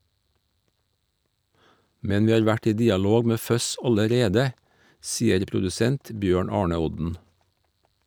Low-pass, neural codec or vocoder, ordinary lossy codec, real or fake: none; none; none; real